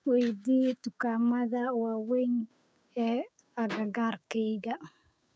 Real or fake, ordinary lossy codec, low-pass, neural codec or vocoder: fake; none; none; codec, 16 kHz, 6 kbps, DAC